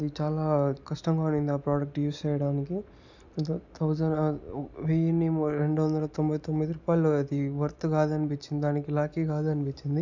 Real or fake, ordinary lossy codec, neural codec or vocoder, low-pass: real; none; none; 7.2 kHz